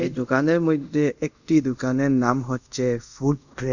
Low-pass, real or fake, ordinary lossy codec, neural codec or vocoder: 7.2 kHz; fake; none; codec, 24 kHz, 0.5 kbps, DualCodec